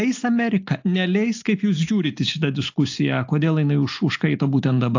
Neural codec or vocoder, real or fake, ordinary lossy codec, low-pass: none; real; AAC, 48 kbps; 7.2 kHz